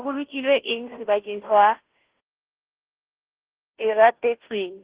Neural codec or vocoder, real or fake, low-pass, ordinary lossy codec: codec, 16 kHz, 0.5 kbps, FunCodec, trained on Chinese and English, 25 frames a second; fake; 3.6 kHz; Opus, 16 kbps